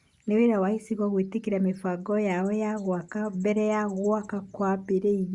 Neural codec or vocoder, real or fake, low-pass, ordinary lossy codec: none; real; 10.8 kHz; Opus, 64 kbps